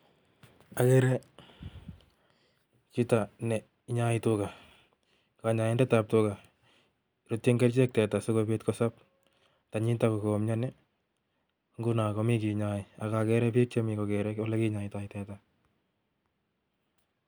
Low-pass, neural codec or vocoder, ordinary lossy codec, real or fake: none; none; none; real